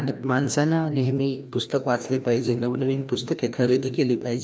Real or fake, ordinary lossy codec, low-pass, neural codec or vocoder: fake; none; none; codec, 16 kHz, 1 kbps, FreqCodec, larger model